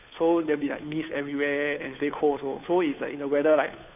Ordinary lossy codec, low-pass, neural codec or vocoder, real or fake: none; 3.6 kHz; codec, 16 kHz, 2 kbps, FunCodec, trained on Chinese and English, 25 frames a second; fake